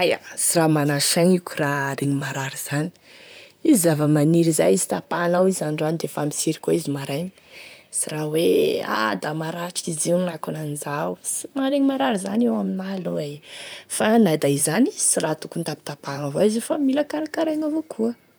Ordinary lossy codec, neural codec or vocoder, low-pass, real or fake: none; vocoder, 44.1 kHz, 128 mel bands, Pupu-Vocoder; none; fake